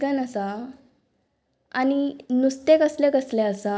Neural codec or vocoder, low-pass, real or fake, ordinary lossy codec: none; none; real; none